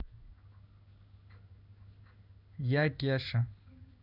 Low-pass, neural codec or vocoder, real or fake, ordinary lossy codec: 5.4 kHz; codec, 16 kHz in and 24 kHz out, 1 kbps, XY-Tokenizer; fake; none